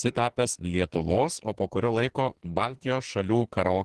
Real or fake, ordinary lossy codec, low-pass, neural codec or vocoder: fake; Opus, 16 kbps; 10.8 kHz; codec, 44.1 kHz, 2.6 kbps, SNAC